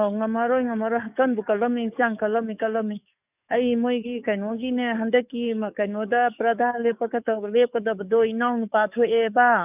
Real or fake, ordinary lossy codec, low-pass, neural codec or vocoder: fake; none; 3.6 kHz; codec, 44.1 kHz, 7.8 kbps, DAC